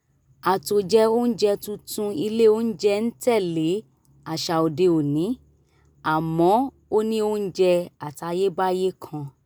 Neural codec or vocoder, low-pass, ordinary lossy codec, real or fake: none; none; none; real